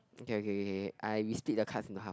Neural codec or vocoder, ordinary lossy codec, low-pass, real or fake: none; none; none; real